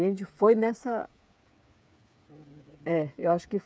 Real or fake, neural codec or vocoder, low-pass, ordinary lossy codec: fake; codec, 16 kHz, 8 kbps, FreqCodec, smaller model; none; none